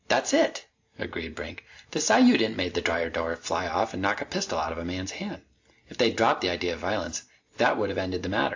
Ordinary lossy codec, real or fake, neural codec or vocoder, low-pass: AAC, 48 kbps; real; none; 7.2 kHz